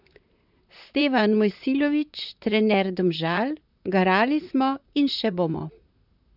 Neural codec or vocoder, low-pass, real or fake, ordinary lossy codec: vocoder, 44.1 kHz, 128 mel bands, Pupu-Vocoder; 5.4 kHz; fake; none